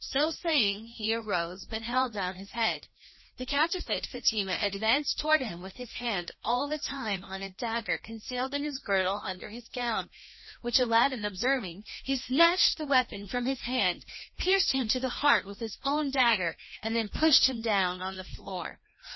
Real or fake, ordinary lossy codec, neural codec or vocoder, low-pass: fake; MP3, 24 kbps; codec, 16 kHz, 1 kbps, FreqCodec, larger model; 7.2 kHz